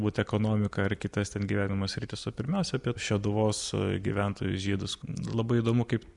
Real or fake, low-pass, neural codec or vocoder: real; 10.8 kHz; none